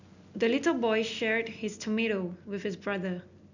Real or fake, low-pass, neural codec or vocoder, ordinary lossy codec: real; 7.2 kHz; none; none